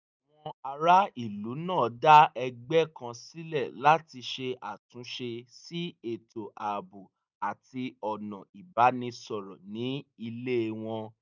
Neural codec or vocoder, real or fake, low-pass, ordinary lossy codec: none; real; 7.2 kHz; none